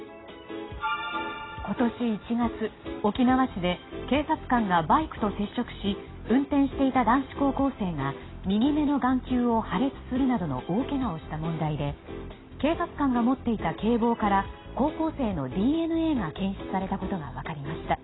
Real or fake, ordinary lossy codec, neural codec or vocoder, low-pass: real; AAC, 16 kbps; none; 7.2 kHz